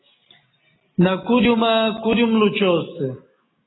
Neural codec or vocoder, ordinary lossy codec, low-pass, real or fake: none; AAC, 16 kbps; 7.2 kHz; real